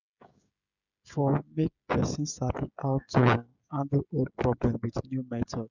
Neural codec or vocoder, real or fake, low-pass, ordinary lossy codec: codec, 16 kHz, 16 kbps, FreqCodec, smaller model; fake; 7.2 kHz; none